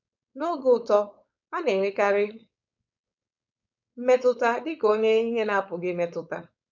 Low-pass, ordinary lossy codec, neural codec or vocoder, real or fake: 7.2 kHz; none; codec, 16 kHz, 4.8 kbps, FACodec; fake